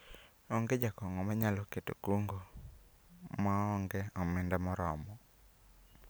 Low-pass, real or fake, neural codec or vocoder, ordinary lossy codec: none; real; none; none